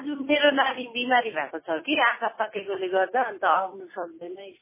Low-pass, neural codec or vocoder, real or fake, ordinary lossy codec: 3.6 kHz; none; real; MP3, 16 kbps